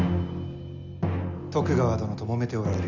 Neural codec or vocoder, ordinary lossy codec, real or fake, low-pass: none; none; real; 7.2 kHz